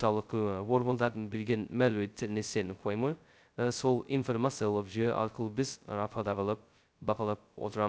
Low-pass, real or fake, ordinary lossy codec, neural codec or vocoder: none; fake; none; codec, 16 kHz, 0.2 kbps, FocalCodec